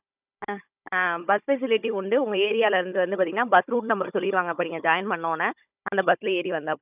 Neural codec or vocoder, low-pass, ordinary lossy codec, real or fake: codec, 16 kHz, 16 kbps, FunCodec, trained on Chinese and English, 50 frames a second; 3.6 kHz; none; fake